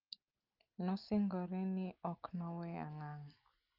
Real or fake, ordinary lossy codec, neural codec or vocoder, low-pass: real; Opus, 32 kbps; none; 5.4 kHz